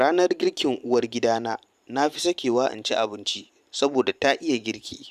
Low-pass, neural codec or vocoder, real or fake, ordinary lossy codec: 14.4 kHz; vocoder, 44.1 kHz, 128 mel bands every 512 samples, BigVGAN v2; fake; none